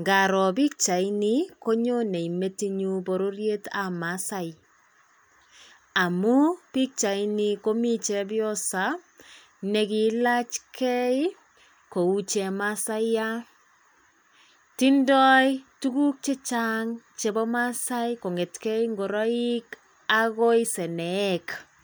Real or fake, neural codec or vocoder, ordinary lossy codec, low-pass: real; none; none; none